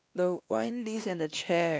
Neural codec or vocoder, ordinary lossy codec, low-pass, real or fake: codec, 16 kHz, 1 kbps, X-Codec, WavLM features, trained on Multilingual LibriSpeech; none; none; fake